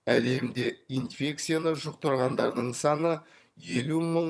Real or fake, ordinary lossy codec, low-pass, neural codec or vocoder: fake; none; none; vocoder, 22.05 kHz, 80 mel bands, HiFi-GAN